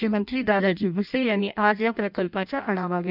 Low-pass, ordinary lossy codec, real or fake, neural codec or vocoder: 5.4 kHz; none; fake; codec, 16 kHz in and 24 kHz out, 0.6 kbps, FireRedTTS-2 codec